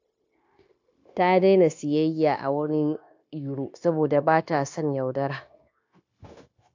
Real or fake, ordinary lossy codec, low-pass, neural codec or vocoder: fake; AAC, 48 kbps; 7.2 kHz; codec, 16 kHz, 0.9 kbps, LongCat-Audio-Codec